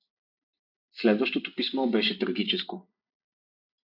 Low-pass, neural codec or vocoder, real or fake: 5.4 kHz; vocoder, 44.1 kHz, 128 mel bands every 256 samples, BigVGAN v2; fake